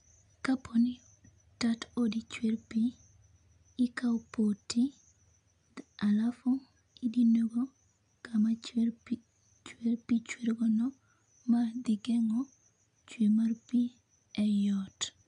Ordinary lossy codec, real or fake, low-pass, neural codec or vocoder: none; real; 9.9 kHz; none